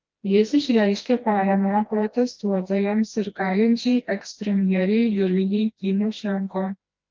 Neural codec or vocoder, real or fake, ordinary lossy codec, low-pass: codec, 16 kHz, 1 kbps, FreqCodec, smaller model; fake; Opus, 24 kbps; 7.2 kHz